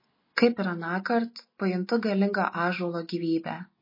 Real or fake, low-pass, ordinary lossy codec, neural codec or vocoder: real; 5.4 kHz; MP3, 24 kbps; none